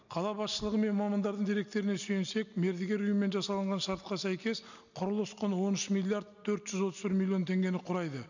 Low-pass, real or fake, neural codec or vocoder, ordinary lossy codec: 7.2 kHz; real; none; none